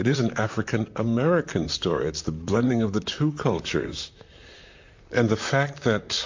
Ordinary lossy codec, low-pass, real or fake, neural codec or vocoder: MP3, 48 kbps; 7.2 kHz; fake; vocoder, 44.1 kHz, 128 mel bands, Pupu-Vocoder